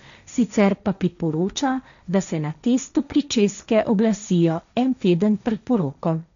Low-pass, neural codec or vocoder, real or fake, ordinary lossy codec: 7.2 kHz; codec, 16 kHz, 1.1 kbps, Voila-Tokenizer; fake; none